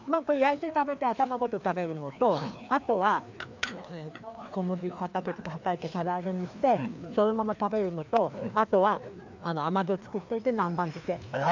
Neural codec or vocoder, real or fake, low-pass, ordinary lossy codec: codec, 16 kHz, 2 kbps, FreqCodec, larger model; fake; 7.2 kHz; MP3, 64 kbps